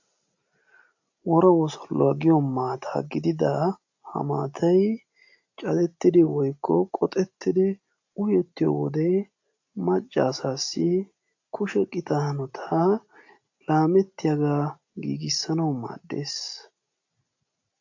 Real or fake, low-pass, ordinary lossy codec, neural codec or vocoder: real; 7.2 kHz; AAC, 48 kbps; none